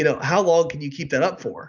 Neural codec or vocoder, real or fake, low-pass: none; real; 7.2 kHz